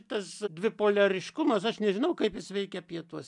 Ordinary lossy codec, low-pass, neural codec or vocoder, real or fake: MP3, 96 kbps; 10.8 kHz; none; real